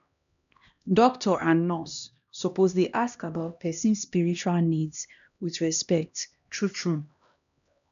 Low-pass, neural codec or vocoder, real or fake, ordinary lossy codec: 7.2 kHz; codec, 16 kHz, 1 kbps, X-Codec, HuBERT features, trained on LibriSpeech; fake; none